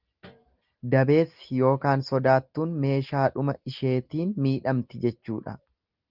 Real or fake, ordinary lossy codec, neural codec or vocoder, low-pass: real; Opus, 32 kbps; none; 5.4 kHz